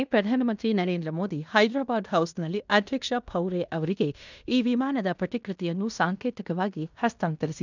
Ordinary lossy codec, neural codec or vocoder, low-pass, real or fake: none; codec, 16 kHz in and 24 kHz out, 0.9 kbps, LongCat-Audio-Codec, fine tuned four codebook decoder; 7.2 kHz; fake